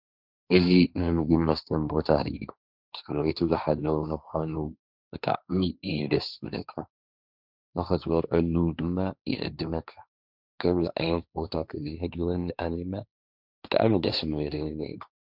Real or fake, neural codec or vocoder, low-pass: fake; codec, 16 kHz, 1.1 kbps, Voila-Tokenizer; 5.4 kHz